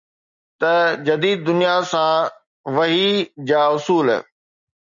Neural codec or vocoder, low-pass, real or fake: none; 7.2 kHz; real